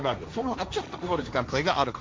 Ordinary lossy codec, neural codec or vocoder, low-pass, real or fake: none; codec, 16 kHz, 1.1 kbps, Voila-Tokenizer; none; fake